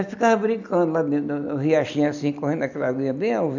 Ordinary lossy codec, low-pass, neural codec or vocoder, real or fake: none; 7.2 kHz; none; real